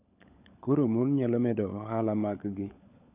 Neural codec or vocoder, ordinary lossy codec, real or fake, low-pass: codec, 16 kHz, 16 kbps, FunCodec, trained on LibriTTS, 50 frames a second; none; fake; 3.6 kHz